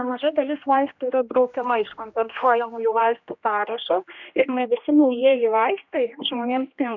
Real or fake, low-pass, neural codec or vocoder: fake; 7.2 kHz; codec, 16 kHz, 1 kbps, X-Codec, HuBERT features, trained on general audio